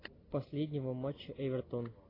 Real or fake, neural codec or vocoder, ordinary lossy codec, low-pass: real; none; AAC, 24 kbps; 5.4 kHz